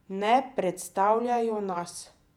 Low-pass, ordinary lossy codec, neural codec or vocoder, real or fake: 19.8 kHz; none; vocoder, 48 kHz, 128 mel bands, Vocos; fake